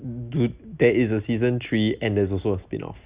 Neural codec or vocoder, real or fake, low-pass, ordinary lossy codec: none; real; 3.6 kHz; Opus, 64 kbps